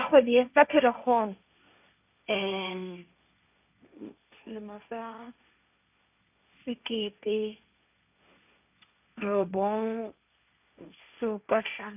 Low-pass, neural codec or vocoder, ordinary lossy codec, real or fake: 3.6 kHz; codec, 16 kHz, 1.1 kbps, Voila-Tokenizer; none; fake